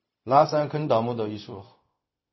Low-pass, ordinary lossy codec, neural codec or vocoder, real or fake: 7.2 kHz; MP3, 24 kbps; codec, 16 kHz, 0.4 kbps, LongCat-Audio-Codec; fake